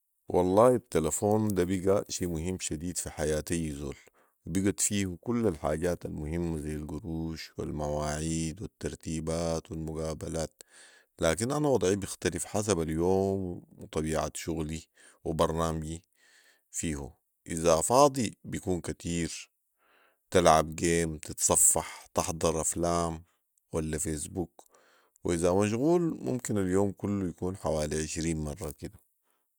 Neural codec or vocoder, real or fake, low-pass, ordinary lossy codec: none; real; none; none